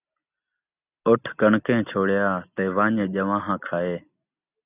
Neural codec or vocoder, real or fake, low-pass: none; real; 3.6 kHz